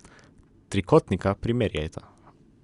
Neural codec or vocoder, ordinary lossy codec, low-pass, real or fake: none; Opus, 64 kbps; 10.8 kHz; real